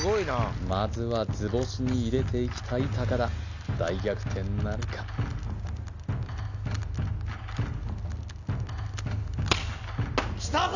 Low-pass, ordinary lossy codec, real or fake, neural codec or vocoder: 7.2 kHz; none; real; none